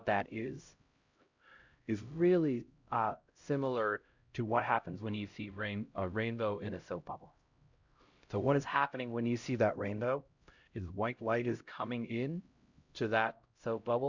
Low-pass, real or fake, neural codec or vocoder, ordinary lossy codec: 7.2 kHz; fake; codec, 16 kHz, 0.5 kbps, X-Codec, HuBERT features, trained on LibriSpeech; Opus, 64 kbps